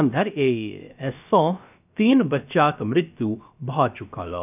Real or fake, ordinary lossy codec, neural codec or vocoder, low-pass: fake; none; codec, 16 kHz, about 1 kbps, DyCAST, with the encoder's durations; 3.6 kHz